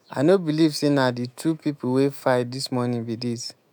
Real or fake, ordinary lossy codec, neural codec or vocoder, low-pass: fake; none; autoencoder, 48 kHz, 128 numbers a frame, DAC-VAE, trained on Japanese speech; none